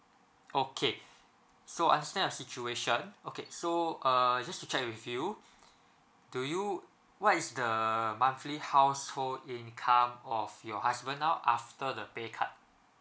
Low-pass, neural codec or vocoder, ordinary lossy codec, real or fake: none; none; none; real